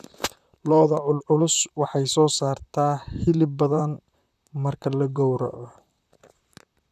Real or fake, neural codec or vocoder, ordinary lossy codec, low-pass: fake; vocoder, 44.1 kHz, 128 mel bands, Pupu-Vocoder; none; 14.4 kHz